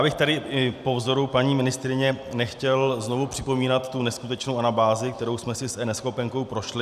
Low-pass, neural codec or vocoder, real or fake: 14.4 kHz; none; real